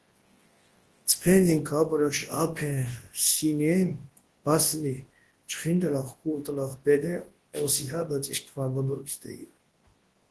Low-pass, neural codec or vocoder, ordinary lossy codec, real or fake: 10.8 kHz; codec, 24 kHz, 0.9 kbps, WavTokenizer, large speech release; Opus, 16 kbps; fake